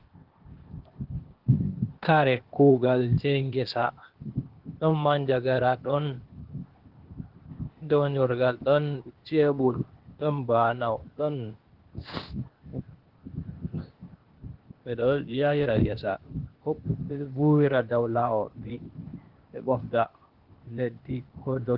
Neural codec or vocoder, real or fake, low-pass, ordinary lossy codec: codec, 16 kHz, 0.8 kbps, ZipCodec; fake; 5.4 kHz; Opus, 16 kbps